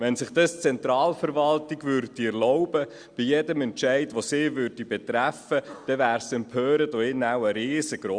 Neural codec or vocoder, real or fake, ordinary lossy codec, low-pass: none; real; Opus, 64 kbps; 9.9 kHz